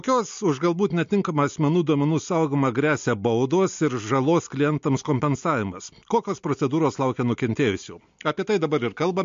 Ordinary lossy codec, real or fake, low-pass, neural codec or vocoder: MP3, 48 kbps; real; 7.2 kHz; none